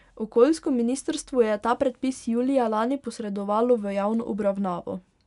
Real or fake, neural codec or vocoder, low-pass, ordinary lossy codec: real; none; 10.8 kHz; none